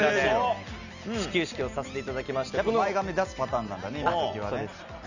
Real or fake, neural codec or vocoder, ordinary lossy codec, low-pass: real; none; none; 7.2 kHz